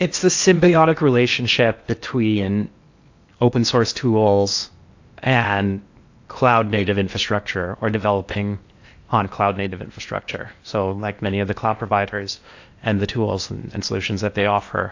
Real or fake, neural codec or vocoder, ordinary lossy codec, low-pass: fake; codec, 16 kHz in and 24 kHz out, 0.8 kbps, FocalCodec, streaming, 65536 codes; AAC, 48 kbps; 7.2 kHz